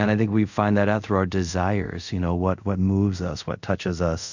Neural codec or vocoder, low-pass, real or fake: codec, 24 kHz, 0.5 kbps, DualCodec; 7.2 kHz; fake